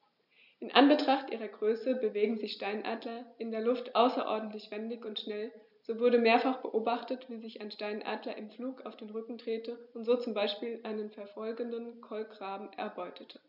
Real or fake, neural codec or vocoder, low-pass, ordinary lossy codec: real; none; 5.4 kHz; none